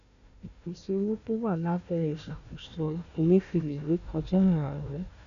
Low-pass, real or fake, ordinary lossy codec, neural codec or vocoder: 7.2 kHz; fake; none; codec, 16 kHz, 1 kbps, FunCodec, trained on Chinese and English, 50 frames a second